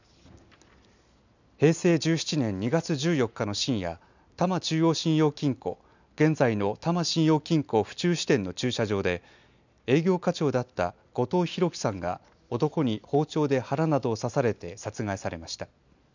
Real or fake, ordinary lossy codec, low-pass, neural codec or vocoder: real; none; 7.2 kHz; none